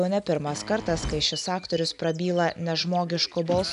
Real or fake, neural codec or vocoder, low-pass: real; none; 10.8 kHz